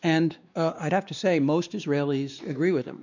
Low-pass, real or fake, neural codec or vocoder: 7.2 kHz; fake; codec, 16 kHz, 2 kbps, X-Codec, WavLM features, trained on Multilingual LibriSpeech